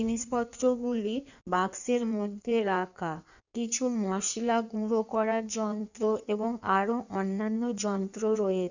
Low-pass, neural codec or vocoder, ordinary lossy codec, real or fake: 7.2 kHz; codec, 16 kHz in and 24 kHz out, 1.1 kbps, FireRedTTS-2 codec; none; fake